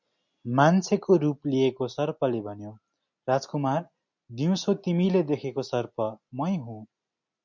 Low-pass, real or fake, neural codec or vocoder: 7.2 kHz; real; none